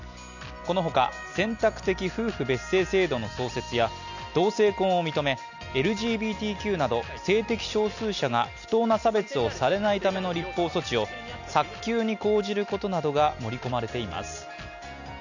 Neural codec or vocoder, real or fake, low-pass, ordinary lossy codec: none; real; 7.2 kHz; none